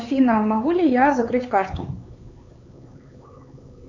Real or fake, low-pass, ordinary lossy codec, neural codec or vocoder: fake; 7.2 kHz; AAC, 48 kbps; codec, 16 kHz, 4 kbps, X-Codec, HuBERT features, trained on LibriSpeech